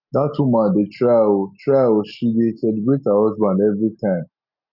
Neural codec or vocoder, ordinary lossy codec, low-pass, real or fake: none; none; 5.4 kHz; real